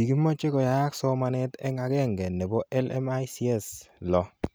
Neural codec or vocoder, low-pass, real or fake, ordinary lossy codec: none; none; real; none